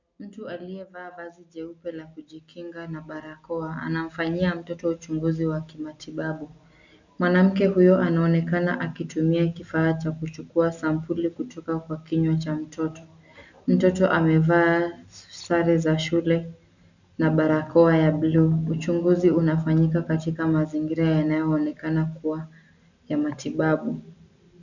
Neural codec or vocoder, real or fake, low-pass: none; real; 7.2 kHz